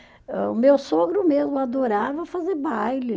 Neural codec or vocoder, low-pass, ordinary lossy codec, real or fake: none; none; none; real